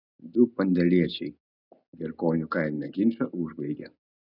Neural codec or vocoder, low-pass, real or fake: none; 5.4 kHz; real